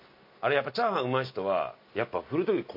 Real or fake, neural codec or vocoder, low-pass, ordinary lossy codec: real; none; 5.4 kHz; MP3, 32 kbps